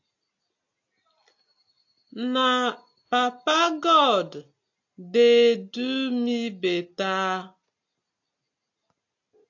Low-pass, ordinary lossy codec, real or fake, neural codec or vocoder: 7.2 kHz; AAC, 48 kbps; real; none